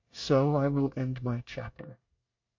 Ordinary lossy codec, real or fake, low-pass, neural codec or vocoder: MP3, 48 kbps; fake; 7.2 kHz; codec, 24 kHz, 1 kbps, SNAC